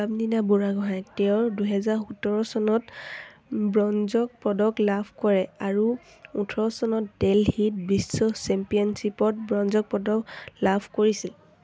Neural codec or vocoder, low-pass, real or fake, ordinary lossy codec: none; none; real; none